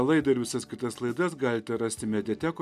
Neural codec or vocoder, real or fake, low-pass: none; real; 14.4 kHz